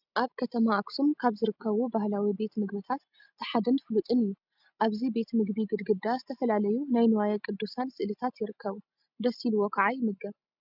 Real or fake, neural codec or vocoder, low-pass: real; none; 5.4 kHz